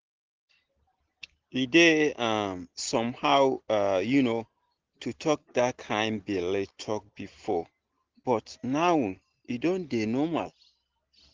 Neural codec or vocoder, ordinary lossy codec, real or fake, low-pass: none; Opus, 16 kbps; real; 7.2 kHz